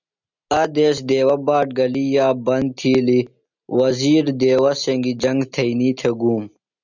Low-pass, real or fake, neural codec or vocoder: 7.2 kHz; real; none